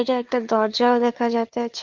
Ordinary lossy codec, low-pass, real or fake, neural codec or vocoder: Opus, 32 kbps; 7.2 kHz; fake; codec, 16 kHz, 4 kbps, FunCodec, trained on LibriTTS, 50 frames a second